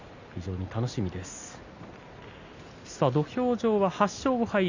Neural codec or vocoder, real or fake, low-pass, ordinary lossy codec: none; real; 7.2 kHz; Opus, 64 kbps